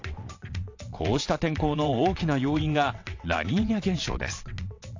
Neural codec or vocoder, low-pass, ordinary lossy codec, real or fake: vocoder, 22.05 kHz, 80 mel bands, WaveNeXt; 7.2 kHz; AAC, 48 kbps; fake